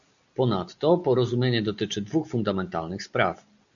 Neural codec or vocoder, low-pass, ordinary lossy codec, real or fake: none; 7.2 kHz; MP3, 96 kbps; real